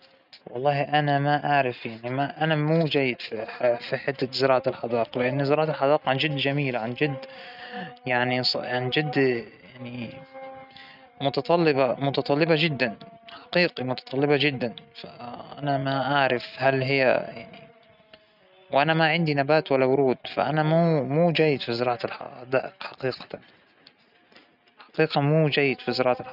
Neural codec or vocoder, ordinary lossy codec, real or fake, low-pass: none; none; real; 5.4 kHz